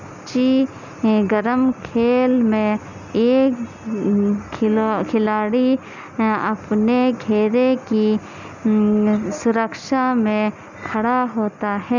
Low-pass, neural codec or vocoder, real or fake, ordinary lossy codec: 7.2 kHz; none; real; none